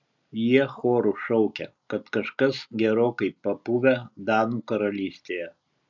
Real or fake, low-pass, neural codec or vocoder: real; 7.2 kHz; none